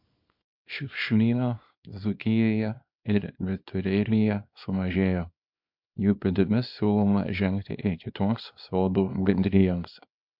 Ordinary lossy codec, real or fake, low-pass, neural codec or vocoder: MP3, 48 kbps; fake; 5.4 kHz; codec, 24 kHz, 0.9 kbps, WavTokenizer, small release